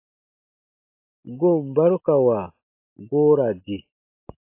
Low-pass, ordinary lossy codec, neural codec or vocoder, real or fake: 3.6 kHz; AAC, 24 kbps; none; real